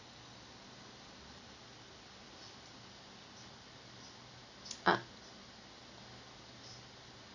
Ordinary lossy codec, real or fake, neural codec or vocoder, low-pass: none; real; none; 7.2 kHz